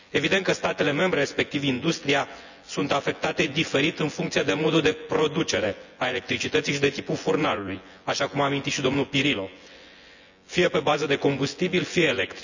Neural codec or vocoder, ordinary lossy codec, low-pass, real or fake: vocoder, 24 kHz, 100 mel bands, Vocos; none; 7.2 kHz; fake